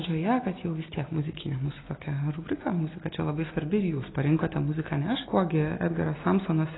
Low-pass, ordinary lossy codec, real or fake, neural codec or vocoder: 7.2 kHz; AAC, 16 kbps; real; none